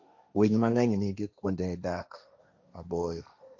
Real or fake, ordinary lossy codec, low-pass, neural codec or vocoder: fake; none; 7.2 kHz; codec, 16 kHz, 1.1 kbps, Voila-Tokenizer